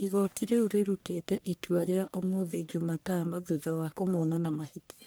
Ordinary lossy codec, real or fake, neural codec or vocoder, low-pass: none; fake; codec, 44.1 kHz, 1.7 kbps, Pupu-Codec; none